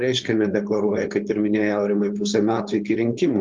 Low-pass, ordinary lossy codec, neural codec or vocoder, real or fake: 7.2 kHz; Opus, 32 kbps; codec, 16 kHz, 4.8 kbps, FACodec; fake